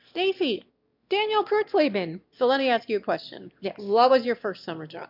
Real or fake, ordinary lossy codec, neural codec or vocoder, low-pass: fake; MP3, 48 kbps; autoencoder, 22.05 kHz, a latent of 192 numbers a frame, VITS, trained on one speaker; 5.4 kHz